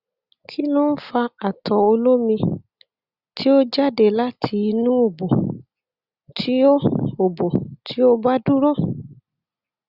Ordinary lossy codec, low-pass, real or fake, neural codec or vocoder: Opus, 64 kbps; 5.4 kHz; real; none